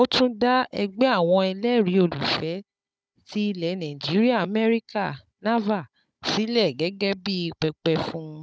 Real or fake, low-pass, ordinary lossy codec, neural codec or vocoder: fake; none; none; codec, 16 kHz, 16 kbps, FunCodec, trained on Chinese and English, 50 frames a second